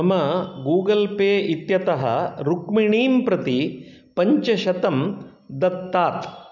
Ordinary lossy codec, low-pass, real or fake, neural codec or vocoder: none; 7.2 kHz; real; none